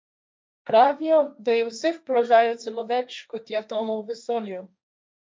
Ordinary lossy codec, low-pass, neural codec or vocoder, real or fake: none; none; codec, 16 kHz, 1.1 kbps, Voila-Tokenizer; fake